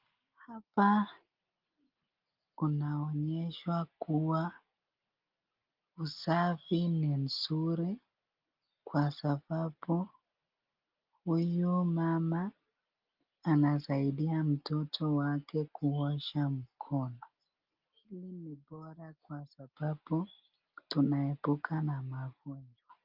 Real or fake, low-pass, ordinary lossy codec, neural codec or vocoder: real; 5.4 kHz; Opus, 24 kbps; none